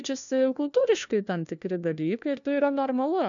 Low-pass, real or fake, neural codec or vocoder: 7.2 kHz; fake; codec, 16 kHz, 1 kbps, FunCodec, trained on LibriTTS, 50 frames a second